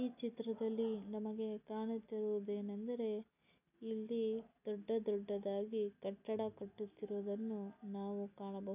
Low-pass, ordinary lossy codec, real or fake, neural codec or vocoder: 3.6 kHz; none; real; none